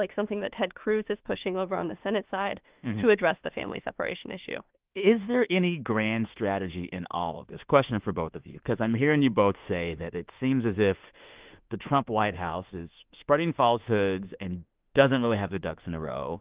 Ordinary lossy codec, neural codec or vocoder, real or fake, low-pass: Opus, 32 kbps; autoencoder, 48 kHz, 32 numbers a frame, DAC-VAE, trained on Japanese speech; fake; 3.6 kHz